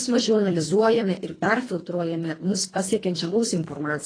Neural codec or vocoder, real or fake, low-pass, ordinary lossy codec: codec, 24 kHz, 1.5 kbps, HILCodec; fake; 9.9 kHz; AAC, 32 kbps